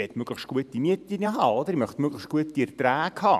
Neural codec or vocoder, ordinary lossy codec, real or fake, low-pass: vocoder, 44.1 kHz, 128 mel bands every 512 samples, BigVGAN v2; none; fake; 14.4 kHz